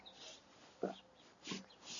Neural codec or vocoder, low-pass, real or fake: none; 7.2 kHz; real